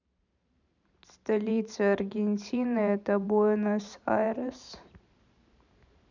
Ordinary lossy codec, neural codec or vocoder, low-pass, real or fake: none; vocoder, 44.1 kHz, 128 mel bands every 512 samples, BigVGAN v2; 7.2 kHz; fake